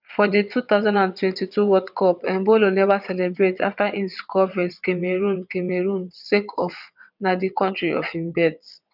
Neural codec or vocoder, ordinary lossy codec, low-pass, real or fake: vocoder, 22.05 kHz, 80 mel bands, WaveNeXt; none; 5.4 kHz; fake